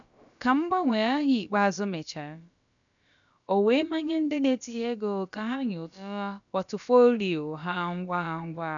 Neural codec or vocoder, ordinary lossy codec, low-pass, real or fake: codec, 16 kHz, about 1 kbps, DyCAST, with the encoder's durations; none; 7.2 kHz; fake